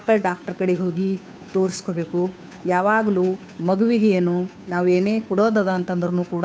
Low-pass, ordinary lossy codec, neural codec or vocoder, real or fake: none; none; codec, 16 kHz, 2 kbps, FunCodec, trained on Chinese and English, 25 frames a second; fake